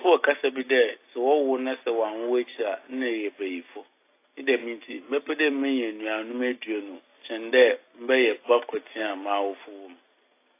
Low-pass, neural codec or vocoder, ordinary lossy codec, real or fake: 3.6 kHz; none; AAC, 24 kbps; real